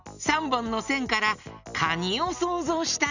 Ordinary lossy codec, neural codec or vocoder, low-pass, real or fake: none; none; 7.2 kHz; real